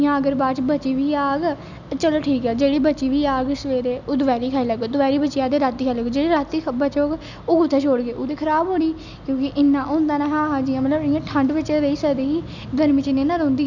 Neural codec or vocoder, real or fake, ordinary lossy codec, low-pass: none; real; none; 7.2 kHz